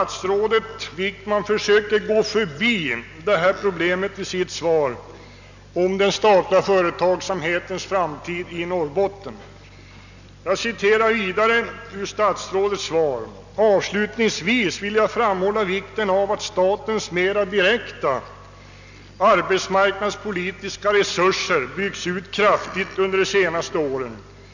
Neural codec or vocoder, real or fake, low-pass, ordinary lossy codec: none; real; 7.2 kHz; none